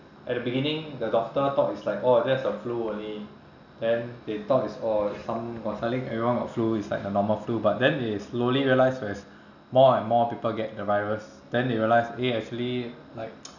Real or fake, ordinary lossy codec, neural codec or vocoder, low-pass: real; none; none; 7.2 kHz